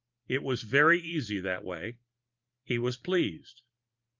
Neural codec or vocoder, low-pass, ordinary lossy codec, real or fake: vocoder, 44.1 kHz, 128 mel bands every 512 samples, BigVGAN v2; 7.2 kHz; Opus, 24 kbps; fake